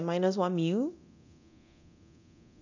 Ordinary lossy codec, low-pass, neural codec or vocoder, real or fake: none; 7.2 kHz; codec, 24 kHz, 0.9 kbps, DualCodec; fake